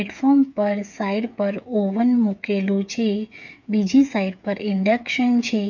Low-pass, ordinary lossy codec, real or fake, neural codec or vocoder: 7.2 kHz; none; fake; codec, 16 kHz, 8 kbps, FreqCodec, smaller model